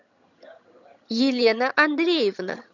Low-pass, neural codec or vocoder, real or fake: 7.2 kHz; vocoder, 22.05 kHz, 80 mel bands, HiFi-GAN; fake